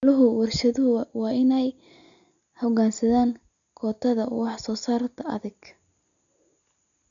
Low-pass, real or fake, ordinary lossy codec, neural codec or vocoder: 7.2 kHz; real; none; none